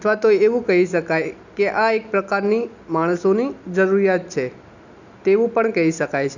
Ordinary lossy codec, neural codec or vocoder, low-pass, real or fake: none; none; 7.2 kHz; real